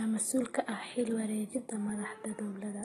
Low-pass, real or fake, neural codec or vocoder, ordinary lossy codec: 14.4 kHz; real; none; AAC, 32 kbps